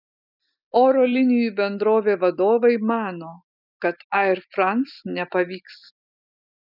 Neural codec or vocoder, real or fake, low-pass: none; real; 5.4 kHz